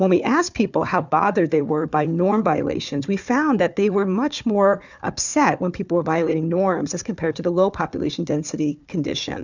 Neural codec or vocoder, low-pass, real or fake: codec, 16 kHz, 4 kbps, FunCodec, trained on Chinese and English, 50 frames a second; 7.2 kHz; fake